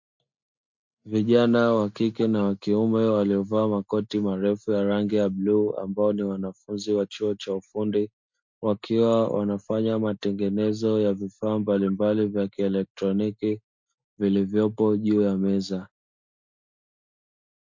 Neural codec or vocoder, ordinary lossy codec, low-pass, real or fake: none; MP3, 48 kbps; 7.2 kHz; real